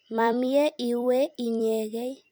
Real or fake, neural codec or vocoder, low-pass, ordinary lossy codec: fake; vocoder, 44.1 kHz, 128 mel bands every 256 samples, BigVGAN v2; none; none